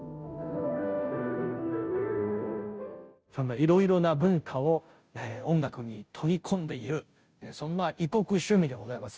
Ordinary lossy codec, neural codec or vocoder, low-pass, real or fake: none; codec, 16 kHz, 0.5 kbps, FunCodec, trained on Chinese and English, 25 frames a second; none; fake